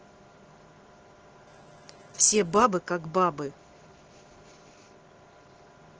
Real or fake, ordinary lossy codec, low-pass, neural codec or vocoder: real; Opus, 16 kbps; 7.2 kHz; none